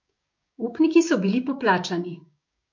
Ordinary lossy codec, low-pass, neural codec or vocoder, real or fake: MP3, 48 kbps; 7.2 kHz; codec, 16 kHz, 6 kbps, DAC; fake